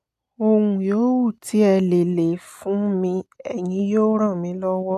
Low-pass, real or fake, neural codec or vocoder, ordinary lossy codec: 14.4 kHz; real; none; none